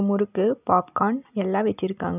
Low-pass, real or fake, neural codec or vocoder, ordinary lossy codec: 3.6 kHz; real; none; none